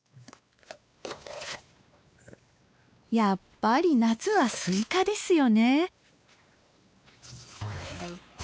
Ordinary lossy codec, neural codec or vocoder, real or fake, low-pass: none; codec, 16 kHz, 2 kbps, X-Codec, WavLM features, trained on Multilingual LibriSpeech; fake; none